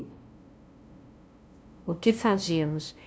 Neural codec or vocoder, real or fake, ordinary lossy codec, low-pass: codec, 16 kHz, 0.5 kbps, FunCodec, trained on LibriTTS, 25 frames a second; fake; none; none